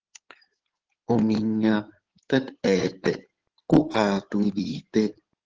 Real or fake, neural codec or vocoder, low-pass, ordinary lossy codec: fake; codec, 16 kHz in and 24 kHz out, 2.2 kbps, FireRedTTS-2 codec; 7.2 kHz; Opus, 16 kbps